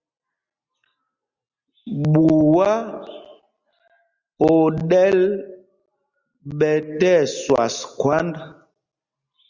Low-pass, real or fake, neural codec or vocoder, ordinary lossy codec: 7.2 kHz; real; none; Opus, 64 kbps